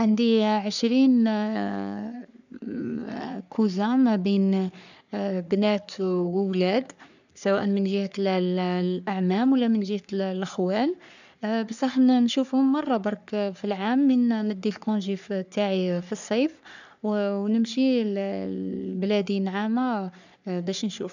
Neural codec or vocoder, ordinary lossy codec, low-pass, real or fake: codec, 44.1 kHz, 3.4 kbps, Pupu-Codec; none; 7.2 kHz; fake